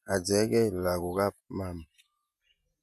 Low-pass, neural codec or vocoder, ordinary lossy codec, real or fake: 14.4 kHz; vocoder, 48 kHz, 128 mel bands, Vocos; none; fake